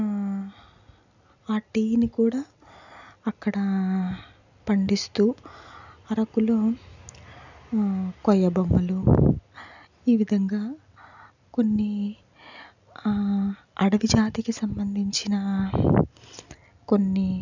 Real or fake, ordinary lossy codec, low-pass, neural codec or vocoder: real; none; 7.2 kHz; none